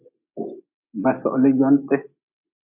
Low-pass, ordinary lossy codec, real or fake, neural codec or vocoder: 3.6 kHz; MP3, 32 kbps; real; none